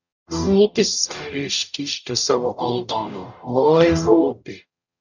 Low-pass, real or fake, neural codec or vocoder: 7.2 kHz; fake; codec, 44.1 kHz, 0.9 kbps, DAC